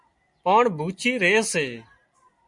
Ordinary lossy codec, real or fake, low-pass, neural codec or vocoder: MP3, 64 kbps; real; 10.8 kHz; none